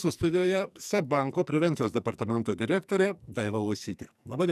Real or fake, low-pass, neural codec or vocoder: fake; 14.4 kHz; codec, 44.1 kHz, 2.6 kbps, SNAC